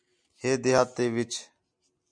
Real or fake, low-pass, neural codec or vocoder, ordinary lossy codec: real; 9.9 kHz; none; MP3, 96 kbps